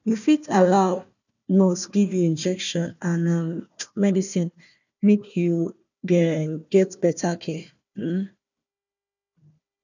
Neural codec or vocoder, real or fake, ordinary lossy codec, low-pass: codec, 16 kHz, 1 kbps, FunCodec, trained on Chinese and English, 50 frames a second; fake; none; 7.2 kHz